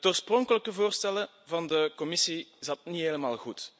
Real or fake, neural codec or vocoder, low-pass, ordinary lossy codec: real; none; none; none